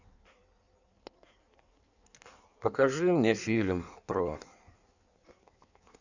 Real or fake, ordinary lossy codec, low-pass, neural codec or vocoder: fake; none; 7.2 kHz; codec, 16 kHz in and 24 kHz out, 1.1 kbps, FireRedTTS-2 codec